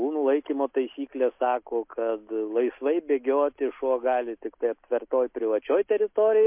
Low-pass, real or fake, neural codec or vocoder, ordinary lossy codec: 3.6 kHz; real; none; MP3, 32 kbps